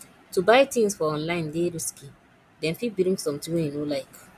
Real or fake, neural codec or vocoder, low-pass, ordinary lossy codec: real; none; 14.4 kHz; none